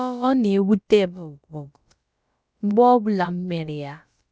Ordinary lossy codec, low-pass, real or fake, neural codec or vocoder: none; none; fake; codec, 16 kHz, about 1 kbps, DyCAST, with the encoder's durations